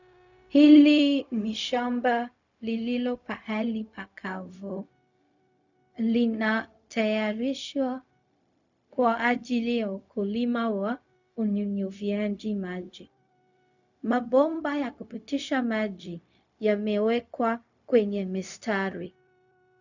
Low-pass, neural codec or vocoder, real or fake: 7.2 kHz; codec, 16 kHz, 0.4 kbps, LongCat-Audio-Codec; fake